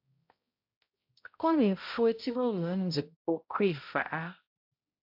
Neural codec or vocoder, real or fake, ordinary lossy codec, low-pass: codec, 16 kHz, 0.5 kbps, X-Codec, HuBERT features, trained on balanced general audio; fake; MP3, 48 kbps; 5.4 kHz